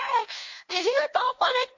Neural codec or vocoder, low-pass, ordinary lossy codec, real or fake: codec, 16 kHz, 1 kbps, FunCodec, trained on LibriTTS, 50 frames a second; 7.2 kHz; AAC, 48 kbps; fake